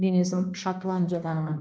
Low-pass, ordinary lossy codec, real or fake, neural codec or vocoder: none; none; fake; codec, 16 kHz, 1 kbps, X-Codec, HuBERT features, trained on balanced general audio